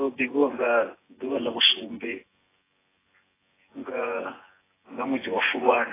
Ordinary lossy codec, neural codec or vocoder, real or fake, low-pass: AAC, 16 kbps; vocoder, 24 kHz, 100 mel bands, Vocos; fake; 3.6 kHz